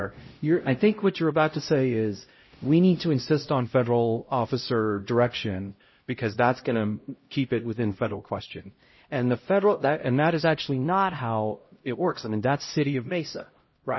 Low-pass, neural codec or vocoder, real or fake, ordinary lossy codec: 7.2 kHz; codec, 16 kHz, 0.5 kbps, X-Codec, HuBERT features, trained on LibriSpeech; fake; MP3, 24 kbps